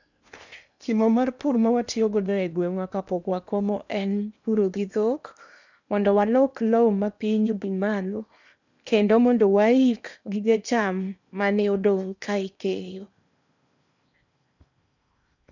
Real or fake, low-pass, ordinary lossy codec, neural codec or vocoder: fake; 7.2 kHz; none; codec, 16 kHz in and 24 kHz out, 0.8 kbps, FocalCodec, streaming, 65536 codes